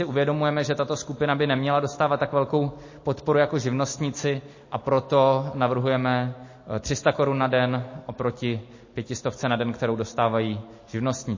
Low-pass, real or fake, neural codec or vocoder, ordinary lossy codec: 7.2 kHz; real; none; MP3, 32 kbps